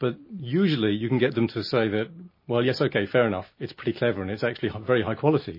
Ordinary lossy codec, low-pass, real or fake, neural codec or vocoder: MP3, 24 kbps; 5.4 kHz; real; none